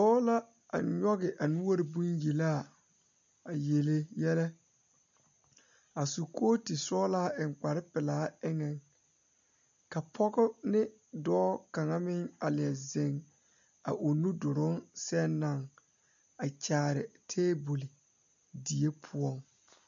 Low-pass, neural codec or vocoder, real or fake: 7.2 kHz; none; real